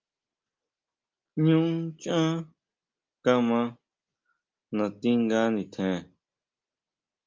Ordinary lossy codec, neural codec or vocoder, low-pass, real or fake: Opus, 24 kbps; none; 7.2 kHz; real